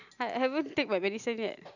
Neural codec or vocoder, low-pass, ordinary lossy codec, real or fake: none; 7.2 kHz; none; real